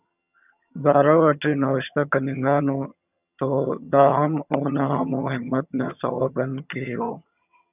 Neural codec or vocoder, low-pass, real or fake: vocoder, 22.05 kHz, 80 mel bands, HiFi-GAN; 3.6 kHz; fake